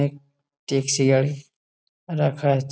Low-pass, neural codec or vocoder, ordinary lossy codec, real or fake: none; none; none; real